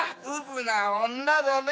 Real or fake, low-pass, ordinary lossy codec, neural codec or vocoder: fake; none; none; codec, 16 kHz, 4 kbps, X-Codec, HuBERT features, trained on general audio